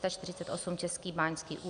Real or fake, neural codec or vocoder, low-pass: real; none; 9.9 kHz